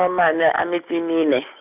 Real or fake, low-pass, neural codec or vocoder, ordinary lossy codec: real; 3.6 kHz; none; none